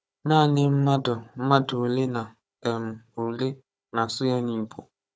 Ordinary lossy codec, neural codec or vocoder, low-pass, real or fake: none; codec, 16 kHz, 4 kbps, FunCodec, trained on Chinese and English, 50 frames a second; none; fake